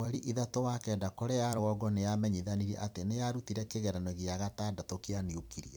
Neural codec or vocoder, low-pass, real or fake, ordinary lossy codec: vocoder, 44.1 kHz, 128 mel bands every 256 samples, BigVGAN v2; none; fake; none